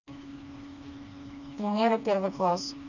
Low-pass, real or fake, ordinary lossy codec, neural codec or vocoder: 7.2 kHz; fake; none; codec, 16 kHz, 2 kbps, FreqCodec, smaller model